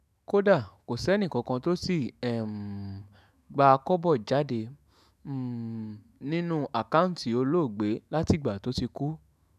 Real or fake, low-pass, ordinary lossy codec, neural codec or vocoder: fake; 14.4 kHz; none; autoencoder, 48 kHz, 128 numbers a frame, DAC-VAE, trained on Japanese speech